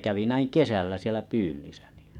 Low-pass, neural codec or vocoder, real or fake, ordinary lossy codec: 19.8 kHz; codec, 44.1 kHz, 7.8 kbps, DAC; fake; MP3, 96 kbps